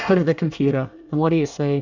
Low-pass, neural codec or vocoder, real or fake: 7.2 kHz; codec, 24 kHz, 1 kbps, SNAC; fake